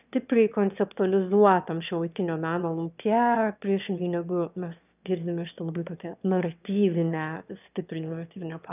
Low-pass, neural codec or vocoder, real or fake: 3.6 kHz; autoencoder, 22.05 kHz, a latent of 192 numbers a frame, VITS, trained on one speaker; fake